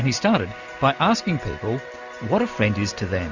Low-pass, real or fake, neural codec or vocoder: 7.2 kHz; real; none